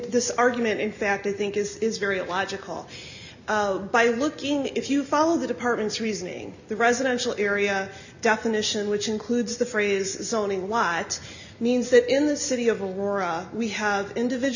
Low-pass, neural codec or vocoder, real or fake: 7.2 kHz; none; real